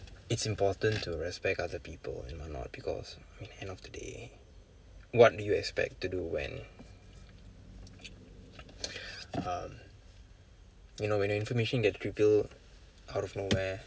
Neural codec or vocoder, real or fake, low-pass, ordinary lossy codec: none; real; none; none